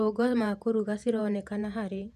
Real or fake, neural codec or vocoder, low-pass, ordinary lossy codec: fake; vocoder, 48 kHz, 128 mel bands, Vocos; 14.4 kHz; none